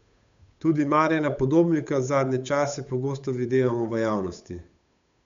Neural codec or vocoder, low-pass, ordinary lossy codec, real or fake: codec, 16 kHz, 8 kbps, FunCodec, trained on Chinese and English, 25 frames a second; 7.2 kHz; MP3, 64 kbps; fake